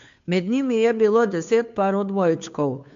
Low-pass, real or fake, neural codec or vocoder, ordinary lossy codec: 7.2 kHz; fake; codec, 16 kHz, 2 kbps, FunCodec, trained on Chinese and English, 25 frames a second; AAC, 64 kbps